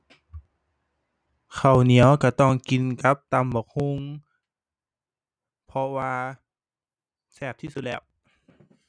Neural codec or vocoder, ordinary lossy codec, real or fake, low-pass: none; none; real; 9.9 kHz